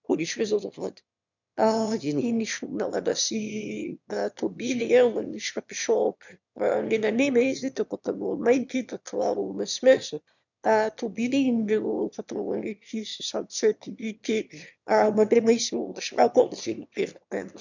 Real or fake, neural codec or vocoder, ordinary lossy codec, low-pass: fake; autoencoder, 22.05 kHz, a latent of 192 numbers a frame, VITS, trained on one speaker; none; 7.2 kHz